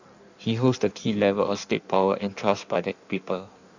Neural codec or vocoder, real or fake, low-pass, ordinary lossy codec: codec, 16 kHz in and 24 kHz out, 1.1 kbps, FireRedTTS-2 codec; fake; 7.2 kHz; none